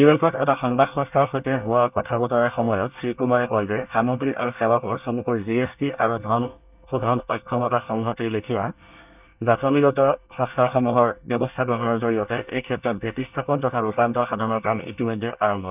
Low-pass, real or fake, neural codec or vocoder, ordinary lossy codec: 3.6 kHz; fake; codec, 24 kHz, 1 kbps, SNAC; none